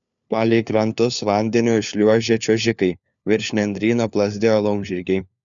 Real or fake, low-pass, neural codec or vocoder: fake; 7.2 kHz; codec, 16 kHz, 4 kbps, FunCodec, trained on LibriTTS, 50 frames a second